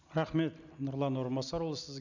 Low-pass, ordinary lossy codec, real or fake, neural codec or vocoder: 7.2 kHz; none; real; none